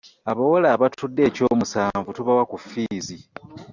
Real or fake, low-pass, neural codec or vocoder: real; 7.2 kHz; none